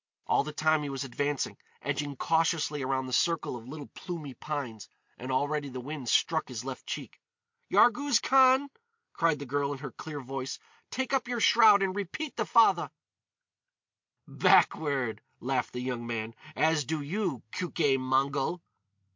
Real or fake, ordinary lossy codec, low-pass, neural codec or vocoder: real; MP3, 48 kbps; 7.2 kHz; none